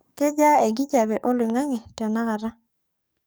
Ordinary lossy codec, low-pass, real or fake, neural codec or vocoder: none; none; fake; codec, 44.1 kHz, 7.8 kbps, DAC